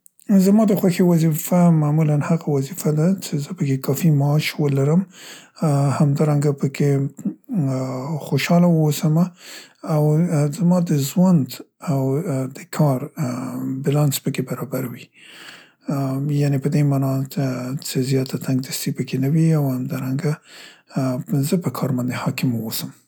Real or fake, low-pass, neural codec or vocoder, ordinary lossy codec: real; none; none; none